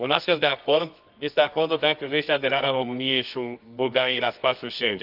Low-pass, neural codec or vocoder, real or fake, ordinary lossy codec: 5.4 kHz; codec, 24 kHz, 0.9 kbps, WavTokenizer, medium music audio release; fake; none